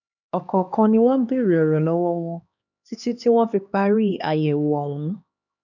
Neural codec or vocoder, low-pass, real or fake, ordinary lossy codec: codec, 16 kHz, 2 kbps, X-Codec, HuBERT features, trained on LibriSpeech; 7.2 kHz; fake; none